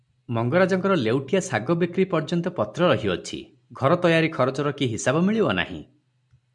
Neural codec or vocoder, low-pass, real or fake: none; 10.8 kHz; real